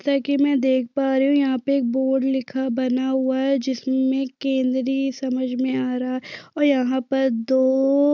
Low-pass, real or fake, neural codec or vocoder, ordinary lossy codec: 7.2 kHz; real; none; none